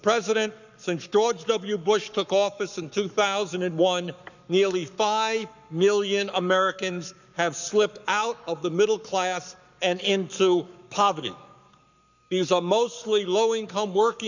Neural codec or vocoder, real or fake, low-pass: codec, 44.1 kHz, 7.8 kbps, Pupu-Codec; fake; 7.2 kHz